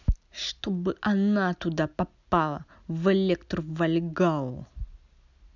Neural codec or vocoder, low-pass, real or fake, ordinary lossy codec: none; 7.2 kHz; real; none